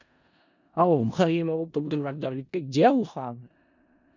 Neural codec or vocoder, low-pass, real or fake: codec, 16 kHz in and 24 kHz out, 0.4 kbps, LongCat-Audio-Codec, four codebook decoder; 7.2 kHz; fake